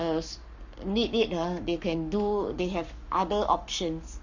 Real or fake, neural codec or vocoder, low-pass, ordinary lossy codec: fake; codec, 44.1 kHz, 7.8 kbps, Pupu-Codec; 7.2 kHz; Opus, 64 kbps